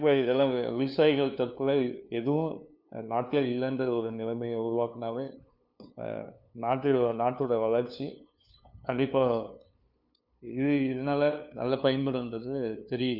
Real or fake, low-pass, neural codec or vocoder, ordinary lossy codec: fake; 5.4 kHz; codec, 16 kHz, 2 kbps, FunCodec, trained on LibriTTS, 25 frames a second; none